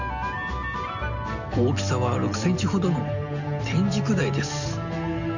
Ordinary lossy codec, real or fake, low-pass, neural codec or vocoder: none; real; 7.2 kHz; none